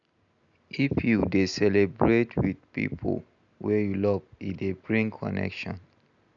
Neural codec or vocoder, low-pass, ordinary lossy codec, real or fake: none; 7.2 kHz; none; real